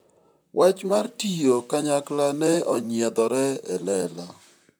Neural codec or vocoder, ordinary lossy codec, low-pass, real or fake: vocoder, 44.1 kHz, 128 mel bands, Pupu-Vocoder; none; none; fake